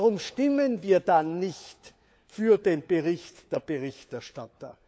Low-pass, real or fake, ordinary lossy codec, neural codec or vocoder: none; fake; none; codec, 16 kHz, 4 kbps, FunCodec, trained on LibriTTS, 50 frames a second